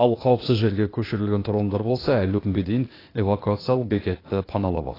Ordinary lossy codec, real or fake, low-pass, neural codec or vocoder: AAC, 24 kbps; fake; 5.4 kHz; codec, 16 kHz, 0.8 kbps, ZipCodec